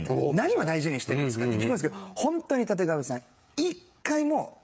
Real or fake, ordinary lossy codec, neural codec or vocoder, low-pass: fake; none; codec, 16 kHz, 8 kbps, FreqCodec, smaller model; none